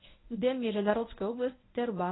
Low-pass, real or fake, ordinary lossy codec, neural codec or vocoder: 7.2 kHz; fake; AAC, 16 kbps; codec, 24 kHz, 0.9 kbps, WavTokenizer, medium speech release version 1